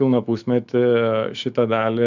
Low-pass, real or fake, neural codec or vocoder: 7.2 kHz; fake; autoencoder, 48 kHz, 128 numbers a frame, DAC-VAE, trained on Japanese speech